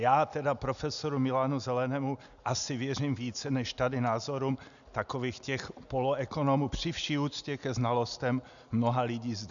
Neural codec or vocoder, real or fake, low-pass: none; real; 7.2 kHz